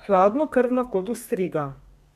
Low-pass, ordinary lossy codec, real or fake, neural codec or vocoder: 14.4 kHz; none; fake; codec, 32 kHz, 1.9 kbps, SNAC